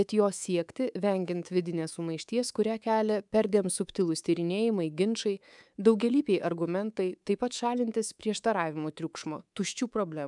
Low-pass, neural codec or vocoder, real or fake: 10.8 kHz; codec, 24 kHz, 3.1 kbps, DualCodec; fake